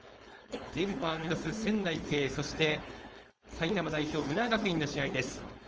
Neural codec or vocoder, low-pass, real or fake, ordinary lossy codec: codec, 16 kHz, 4.8 kbps, FACodec; 7.2 kHz; fake; Opus, 24 kbps